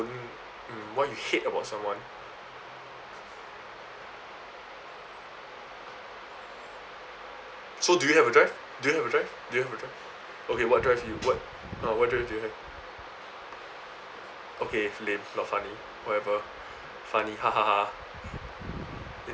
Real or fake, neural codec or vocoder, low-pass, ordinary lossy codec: real; none; none; none